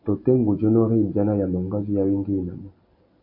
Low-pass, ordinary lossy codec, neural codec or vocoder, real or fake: 5.4 kHz; MP3, 32 kbps; none; real